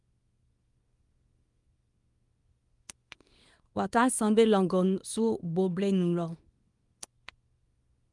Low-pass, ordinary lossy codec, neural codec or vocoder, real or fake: 10.8 kHz; Opus, 32 kbps; codec, 24 kHz, 0.9 kbps, WavTokenizer, small release; fake